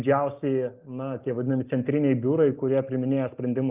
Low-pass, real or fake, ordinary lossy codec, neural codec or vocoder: 3.6 kHz; real; Opus, 24 kbps; none